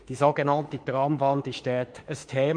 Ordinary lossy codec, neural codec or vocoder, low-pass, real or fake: MP3, 64 kbps; autoencoder, 48 kHz, 32 numbers a frame, DAC-VAE, trained on Japanese speech; 9.9 kHz; fake